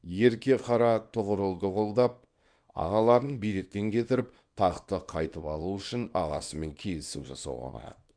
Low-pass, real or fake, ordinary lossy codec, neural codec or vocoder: 9.9 kHz; fake; Opus, 64 kbps; codec, 24 kHz, 0.9 kbps, WavTokenizer, small release